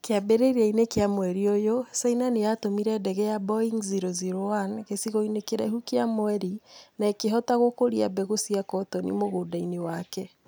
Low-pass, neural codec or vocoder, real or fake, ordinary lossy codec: none; none; real; none